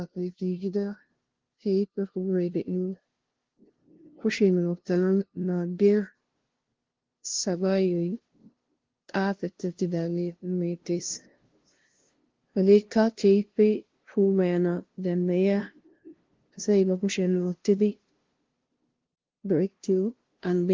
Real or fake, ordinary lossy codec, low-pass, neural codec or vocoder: fake; Opus, 16 kbps; 7.2 kHz; codec, 16 kHz, 0.5 kbps, FunCodec, trained on LibriTTS, 25 frames a second